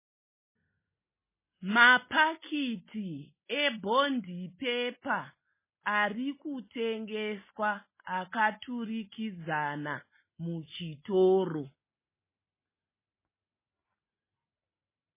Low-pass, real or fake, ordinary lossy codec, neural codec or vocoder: 3.6 kHz; real; MP3, 16 kbps; none